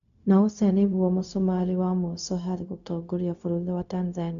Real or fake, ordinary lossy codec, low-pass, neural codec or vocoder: fake; none; 7.2 kHz; codec, 16 kHz, 0.4 kbps, LongCat-Audio-Codec